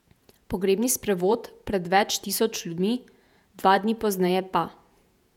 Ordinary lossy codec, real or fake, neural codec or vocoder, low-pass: none; fake; vocoder, 48 kHz, 128 mel bands, Vocos; 19.8 kHz